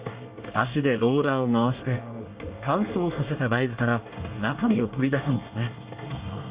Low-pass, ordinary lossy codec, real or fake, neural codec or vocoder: 3.6 kHz; Opus, 64 kbps; fake; codec, 24 kHz, 1 kbps, SNAC